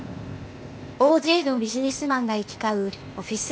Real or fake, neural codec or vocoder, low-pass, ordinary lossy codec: fake; codec, 16 kHz, 0.8 kbps, ZipCodec; none; none